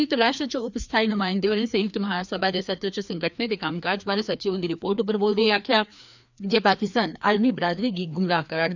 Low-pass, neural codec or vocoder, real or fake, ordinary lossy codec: 7.2 kHz; codec, 16 kHz, 2 kbps, FreqCodec, larger model; fake; none